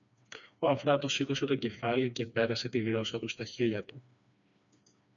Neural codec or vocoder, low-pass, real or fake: codec, 16 kHz, 2 kbps, FreqCodec, smaller model; 7.2 kHz; fake